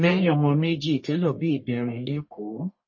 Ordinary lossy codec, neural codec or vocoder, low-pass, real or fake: MP3, 32 kbps; codec, 44.1 kHz, 1.7 kbps, Pupu-Codec; 7.2 kHz; fake